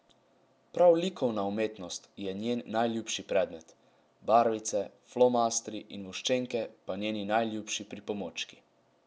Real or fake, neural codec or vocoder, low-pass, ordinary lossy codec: real; none; none; none